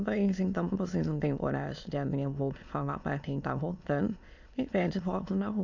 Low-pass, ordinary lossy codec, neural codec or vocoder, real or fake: 7.2 kHz; none; autoencoder, 22.05 kHz, a latent of 192 numbers a frame, VITS, trained on many speakers; fake